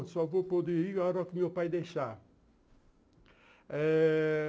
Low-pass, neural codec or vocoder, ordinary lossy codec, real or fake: none; none; none; real